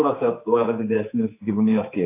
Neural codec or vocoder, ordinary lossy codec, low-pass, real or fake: codec, 16 kHz, 4 kbps, X-Codec, HuBERT features, trained on general audio; AAC, 24 kbps; 3.6 kHz; fake